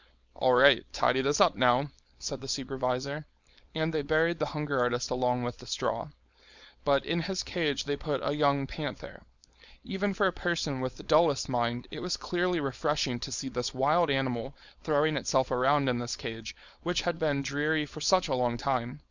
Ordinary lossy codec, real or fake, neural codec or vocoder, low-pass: Opus, 64 kbps; fake; codec, 16 kHz, 4.8 kbps, FACodec; 7.2 kHz